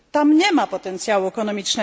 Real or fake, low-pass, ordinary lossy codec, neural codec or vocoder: real; none; none; none